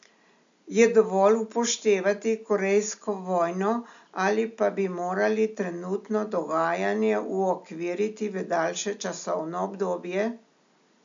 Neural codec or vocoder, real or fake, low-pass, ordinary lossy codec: none; real; 7.2 kHz; MP3, 64 kbps